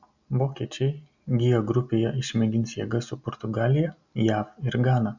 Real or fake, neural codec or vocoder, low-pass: real; none; 7.2 kHz